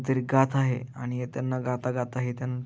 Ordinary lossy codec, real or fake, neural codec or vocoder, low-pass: none; real; none; none